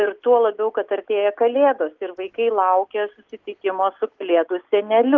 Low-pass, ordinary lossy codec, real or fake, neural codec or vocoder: 7.2 kHz; Opus, 32 kbps; real; none